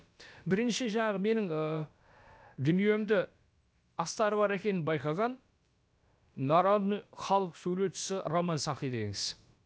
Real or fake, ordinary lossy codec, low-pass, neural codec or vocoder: fake; none; none; codec, 16 kHz, about 1 kbps, DyCAST, with the encoder's durations